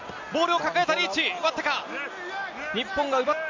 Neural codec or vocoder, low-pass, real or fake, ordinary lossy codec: none; 7.2 kHz; real; MP3, 64 kbps